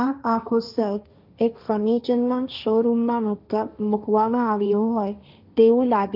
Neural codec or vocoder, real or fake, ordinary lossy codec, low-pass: codec, 16 kHz, 1.1 kbps, Voila-Tokenizer; fake; none; 5.4 kHz